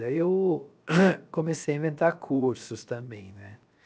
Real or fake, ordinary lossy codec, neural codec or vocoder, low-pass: fake; none; codec, 16 kHz, 0.7 kbps, FocalCodec; none